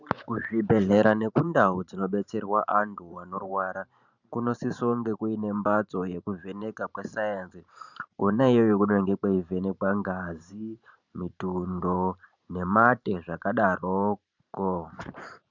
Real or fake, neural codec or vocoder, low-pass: fake; vocoder, 44.1 kHz, 128 mel bands every 512 samples, BigVGAN v2; 7.2 kHz